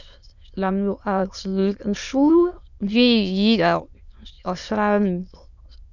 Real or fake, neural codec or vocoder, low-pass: fake; autoencoder, 22.05 kHz, a latent of 192 numbers a frame, VITS, trained on many speakers; 7.2 kHz